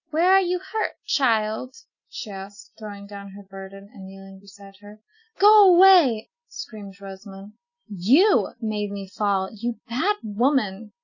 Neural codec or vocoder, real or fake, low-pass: none; real; 7.2 kHz